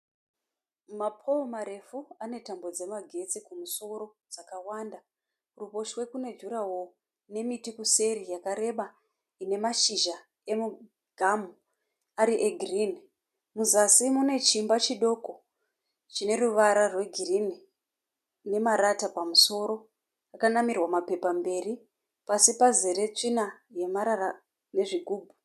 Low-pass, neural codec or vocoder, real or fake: 14.4 kHz; none; real